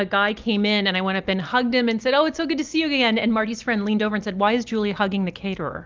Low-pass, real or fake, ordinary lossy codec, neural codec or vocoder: 7.2 kHz; real; Opus, 24 kbps; none